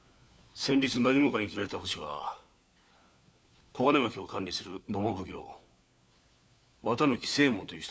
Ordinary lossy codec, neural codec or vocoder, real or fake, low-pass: none; codec, 16 kHz, 4 kbps, FunCodec, trained on LibriTTS, 50 frames a second; fake; none